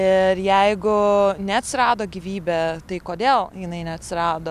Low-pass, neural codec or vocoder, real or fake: 14.4 kHz; none; real